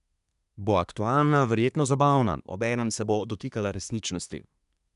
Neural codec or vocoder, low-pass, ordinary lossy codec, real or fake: codec, 24 kHz, 1 kbps, SNAC; 10.8 kHz; none; fake